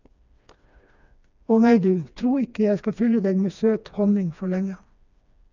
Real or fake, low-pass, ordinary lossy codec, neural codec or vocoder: fake; 7.2 kHz; none; codec, 16 kHz, 2 kbps, FreqCodec, smaller model